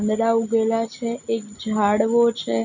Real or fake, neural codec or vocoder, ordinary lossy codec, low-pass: real; none; none; 7.2 kHz